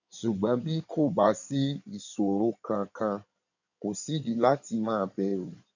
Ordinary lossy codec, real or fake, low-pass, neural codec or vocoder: none; fake; 7.2 kHz; codec, 16 kHz in and 24 kHz out, 2.2 kbps, FireRedTTS-2 codec